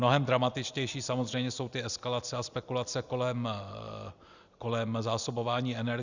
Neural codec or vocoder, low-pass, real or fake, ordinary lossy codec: none; 7.2 kHz; real; Opus, 64 kbps